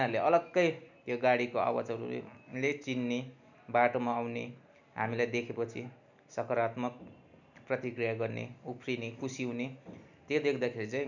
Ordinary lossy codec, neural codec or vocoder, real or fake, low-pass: none; none; real; 7.2 kHz